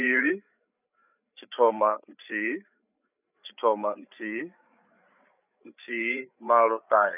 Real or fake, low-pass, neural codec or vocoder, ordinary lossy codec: fake; 3.6 kHz; codec, 16 kHz, 8 kbps, FreqCodec, larger model; none